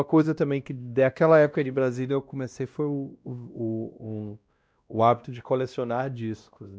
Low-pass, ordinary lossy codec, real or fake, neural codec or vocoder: none; none; fake; codec, 16 kHz, 1 kbps, X-Codec, WavLM features, trained on Multilingual LibriSpeech